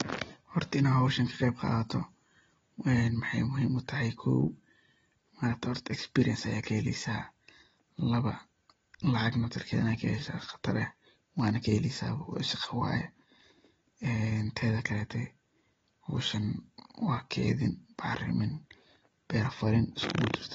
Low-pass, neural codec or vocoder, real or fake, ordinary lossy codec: 7.2 kHz; none; real; AAC, 24 kbps